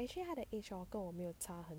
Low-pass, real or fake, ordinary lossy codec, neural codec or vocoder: none; real; none; none